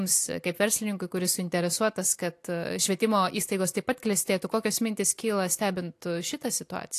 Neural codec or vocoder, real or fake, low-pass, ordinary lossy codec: none; real; 14.4 kHz; AAC, 64 kbps